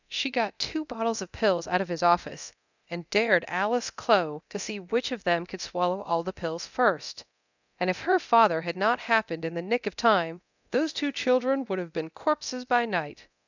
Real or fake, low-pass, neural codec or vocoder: fake; 7.2 kHz; codec, 24 kHz, 0.9 kbps, DualCodec